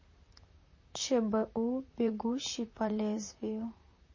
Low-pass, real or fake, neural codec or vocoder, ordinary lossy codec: 7.2 kHz; fake; vocoder, 44.1 kHz, 128 mel bands, Pupu-Vocoder; MP3, 32 kbps